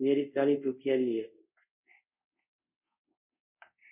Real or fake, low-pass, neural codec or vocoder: fake; 3.6 kHz; codec, 24 kHz, 0.5 kbps, DualCodec